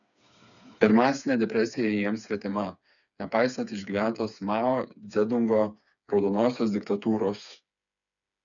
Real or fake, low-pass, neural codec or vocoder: fake; 7.2 kHz; codec, 16 kHz, 4 kbps, FreqCodec, smaller model